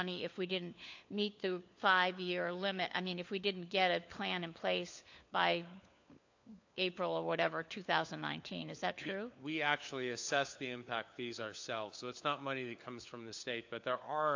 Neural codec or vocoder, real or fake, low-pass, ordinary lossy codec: codec, 16 kHz, 4 kbps, FunCodec, trained on LibriTTS, 50 frames a second; fake; 7.2 kHz; AAC, 48 kbps